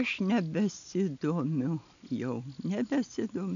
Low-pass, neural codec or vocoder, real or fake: 7.2 kHz; none; real